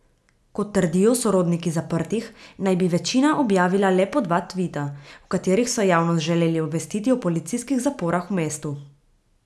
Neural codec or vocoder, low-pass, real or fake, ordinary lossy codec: none; none; real; none